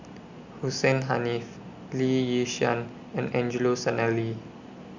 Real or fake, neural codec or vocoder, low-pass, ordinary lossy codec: real; none; 7.2 kHz; Opus, 64 kbps